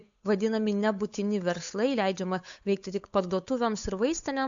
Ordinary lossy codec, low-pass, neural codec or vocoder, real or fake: MP3, 64 kbps; 7.2 kHz; codec, 16 kHz, 8 kbps, FunCodec, trained on Chinese and English, 25 frames a second; fake